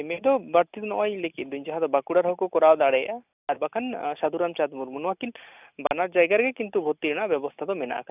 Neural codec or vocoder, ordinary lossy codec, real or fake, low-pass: none; none; real; 3.6 kHz